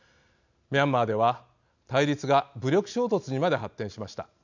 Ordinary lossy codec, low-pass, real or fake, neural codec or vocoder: none; 7.2 kHz; real; none